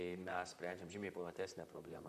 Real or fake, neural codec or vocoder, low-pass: fake; vocoder, 44.1 kHz, 128 mel bands, Pupu-Vocoder; 14.4 kHz